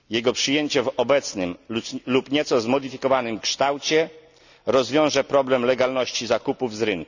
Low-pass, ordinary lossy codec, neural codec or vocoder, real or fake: 7.2 kHz; none; none; real